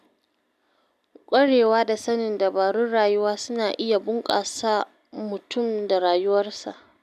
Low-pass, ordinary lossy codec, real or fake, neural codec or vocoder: 14.4 kHz; none; real; none